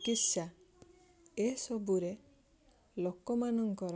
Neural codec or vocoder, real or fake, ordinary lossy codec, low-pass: none; real; none; none